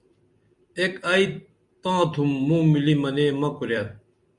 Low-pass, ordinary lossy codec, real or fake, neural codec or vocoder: 10.8 kHz; Opus, 64 kbps; fake; vocoder, 44.1 kHz, 128 mel bands every 256 samples, BigVGAN v2